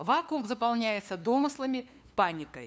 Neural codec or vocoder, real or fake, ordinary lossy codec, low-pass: codec, 16 kHz, 2 kbps, FunCodec, trained on LibriTTS, 25 frames a second; fake; none; none